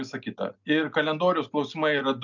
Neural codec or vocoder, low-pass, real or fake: none; 7.2 kHz; real